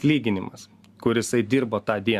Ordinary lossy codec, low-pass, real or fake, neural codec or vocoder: Opus, 64 kbps; 14.4 kHz; real; none